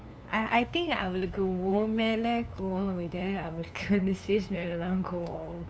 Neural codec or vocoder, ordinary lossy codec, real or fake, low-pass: codec, 16 kHz, 2 kbps, FunCodec, trained on LibriTTS, 25 frames a second; none; fake; none